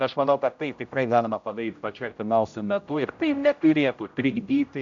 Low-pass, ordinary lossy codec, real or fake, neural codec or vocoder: 7.2 kHz; MP3, 64 kbps; fake; codec, 16 kHz, 0.5 kbps, X-Codec, HuBERT features, trained on general audio